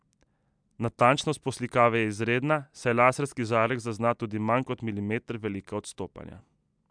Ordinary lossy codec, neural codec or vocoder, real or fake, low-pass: MP3, 96 kbps; none; real; 9.9 kHz